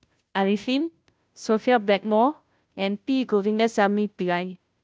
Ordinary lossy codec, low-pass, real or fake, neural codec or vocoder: none; none; fake; codec, 16 kHz, 0.5 kbps, FunCodec, trained on Chinese and English, 25 frames a second